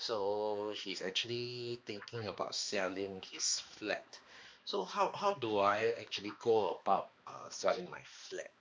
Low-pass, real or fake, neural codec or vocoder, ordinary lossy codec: none; fake; codec, 16 kHz, 2 kbps, X-Codec, HuBERT features, trained on balanced general audio; none